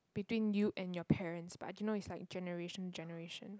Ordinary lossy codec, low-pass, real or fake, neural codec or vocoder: none; none; real; none